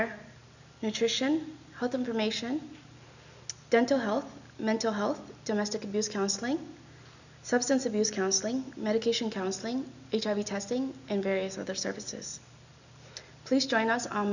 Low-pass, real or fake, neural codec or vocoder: 7.2 kHz; real; none